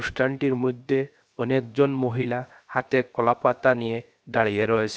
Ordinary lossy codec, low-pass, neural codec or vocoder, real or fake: none; none; codec, 16 kHz, 0.7 kbps, FocalCodec; fake